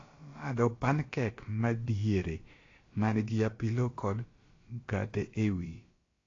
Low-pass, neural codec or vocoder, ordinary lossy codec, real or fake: 7.2 kHz; codec, 16 kHz, about 1 kbps, DyCAST, with the encoder's durations; AAC, 32 kbps; fake